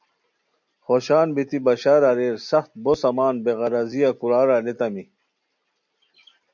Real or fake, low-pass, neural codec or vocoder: real; 7.2 kHz; none